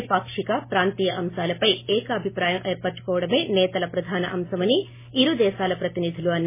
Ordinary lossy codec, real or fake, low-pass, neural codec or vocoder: MP3, 16 kbps; real; 3.6 kHz; none